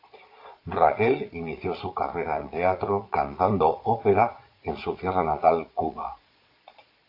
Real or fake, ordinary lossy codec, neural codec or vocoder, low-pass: fake; AAC, 32 kbps; vocoder, 22.05 kHz, 80 mel bands, Vocos; 5.4 kHz